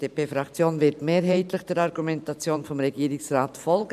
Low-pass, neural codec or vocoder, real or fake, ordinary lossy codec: 14.4 kHz; vocoder, 44.1 kHz, 128 mel bands every 512 samples, BigVGAN v2; fake; none